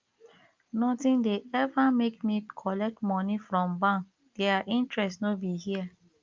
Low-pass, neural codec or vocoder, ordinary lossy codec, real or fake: 7.2 kHz; none; Opus, 24 kbps; real